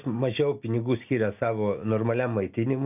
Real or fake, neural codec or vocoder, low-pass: real; none; 3.6 kHz